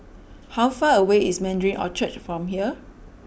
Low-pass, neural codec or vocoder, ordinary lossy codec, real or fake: none; none; none; real